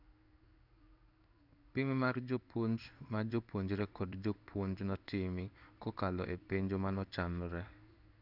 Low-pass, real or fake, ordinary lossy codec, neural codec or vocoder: 5.4 kHz; fake; none; codec, 16 kHz in and 24 kHz out, 1 kbps, XY-Tokenizer